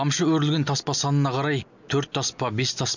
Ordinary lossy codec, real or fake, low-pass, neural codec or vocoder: none; real; 7.2 kHz; none